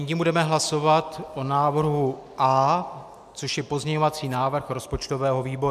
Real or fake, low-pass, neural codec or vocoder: real; 14.4 kHz; none